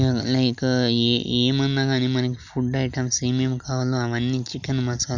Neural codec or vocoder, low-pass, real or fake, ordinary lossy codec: none; 7.2 kHz; real; none